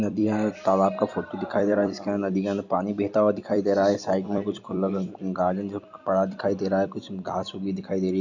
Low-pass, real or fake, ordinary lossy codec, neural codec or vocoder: 7.2 kHz; fake; none; vocoder, 44.1 kHz, 128 mel bands every 256 samples, BigVGAN v2